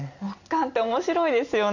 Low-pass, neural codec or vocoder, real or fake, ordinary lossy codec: 7.2 kHz; none; real; none